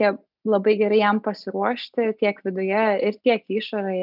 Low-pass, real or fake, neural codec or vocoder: 5.4 kHz; real; none